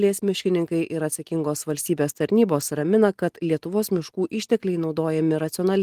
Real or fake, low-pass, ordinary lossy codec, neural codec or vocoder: real; 14.4 kHz; Opus, 24 kbps; none